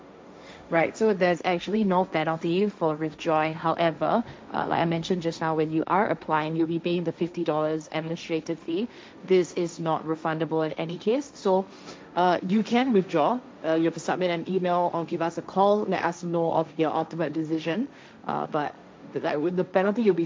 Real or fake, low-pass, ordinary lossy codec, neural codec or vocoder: fake; none; none; codec, 16 kHz, 1.1 kbps, Voila-Tokenizer